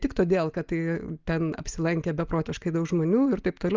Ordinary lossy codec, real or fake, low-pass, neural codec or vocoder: Opus, 32 kbps; real; 7.2 kHz; none